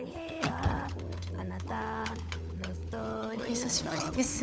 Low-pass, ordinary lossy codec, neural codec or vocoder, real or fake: none; none; codec, 16 kHz, 16 kbps, FunCodec, trained on LibriTTS, 50 frames a second; fake